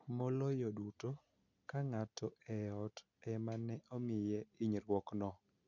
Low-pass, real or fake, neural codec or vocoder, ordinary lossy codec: 7.2 kHz; real; none; none